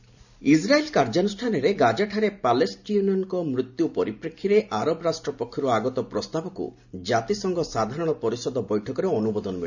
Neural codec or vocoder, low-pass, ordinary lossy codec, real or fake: none; 7.2 kHz; none; real